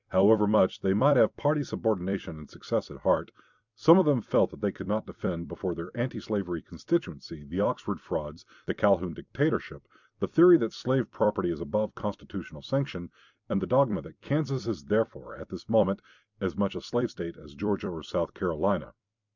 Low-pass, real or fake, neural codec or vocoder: 7.2 kHz; fake; vocoder, 44.1 kHz, 128 mel bands every 256 samples, BigVGAN v2